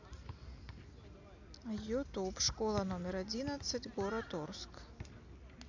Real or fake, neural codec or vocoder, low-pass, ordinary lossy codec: real; none; 7.2 kHz; AAC, 48 kbps